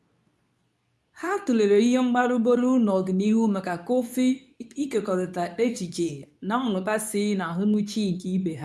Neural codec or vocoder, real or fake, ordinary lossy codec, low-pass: codec, 24 kHz, 0.9 kbps, WavTokenizer, medium speech release version 2; fake; none; none